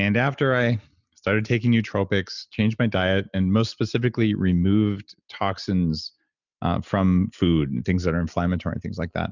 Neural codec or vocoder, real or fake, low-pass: none; real; 7.2 kHz